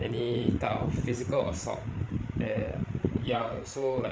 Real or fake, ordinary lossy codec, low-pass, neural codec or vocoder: fake; none; none; codec, 16 kHz, 8 kbps, FreqCodec, larger model